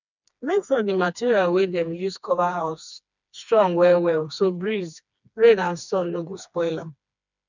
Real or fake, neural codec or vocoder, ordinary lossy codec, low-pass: fake; codec, 16 kHz, 2 kbps, FreqCodec, smaller model; none; 7.2 kHz